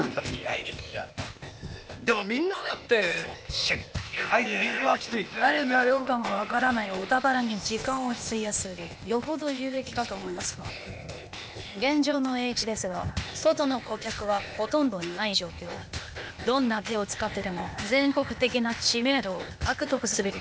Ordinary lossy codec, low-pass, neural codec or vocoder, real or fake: none; none; codec, 16 kHz, 0.8 kbps, ZipCodec; fake